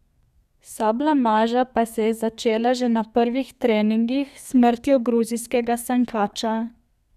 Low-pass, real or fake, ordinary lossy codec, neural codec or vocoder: 14.4 kHz; fake; none; codec, 32 kHz, 1.9 kbps, SNAC